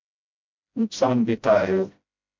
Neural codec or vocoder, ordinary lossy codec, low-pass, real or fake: codec, 16 kHz, 0.5 kbps, FreqCodec, smaller model; MP3, 48 kbps; 7.2 kHz; fake